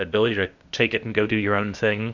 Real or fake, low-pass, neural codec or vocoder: fake; 7.2 kHz; codec, 16 kHz, 0.8 kbps, ZipCodec